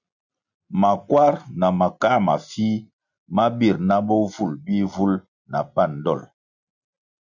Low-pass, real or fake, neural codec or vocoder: 7.2 kHz; real; none